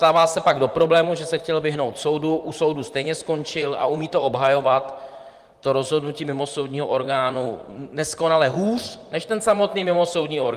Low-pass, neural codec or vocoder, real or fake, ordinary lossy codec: 14.4 kHz; vocoder, 44.1 kHz, 128 mel bands, Pupu-Vocoder; fake; Opus, 32 kbps